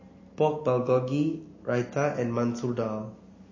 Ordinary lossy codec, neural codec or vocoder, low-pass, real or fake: MP3, 32 kbps; none; 7.2 kHz; real